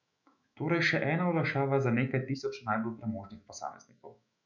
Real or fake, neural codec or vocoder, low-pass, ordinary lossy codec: fake; autoencoder, 48 kHz, 128 numbers a frame, DAC-VAE, trained on Japanese speech; 7.2 kHz; none